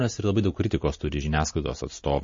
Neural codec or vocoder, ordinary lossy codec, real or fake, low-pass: none; MP3, 32 kbps; real; 7.2 kHz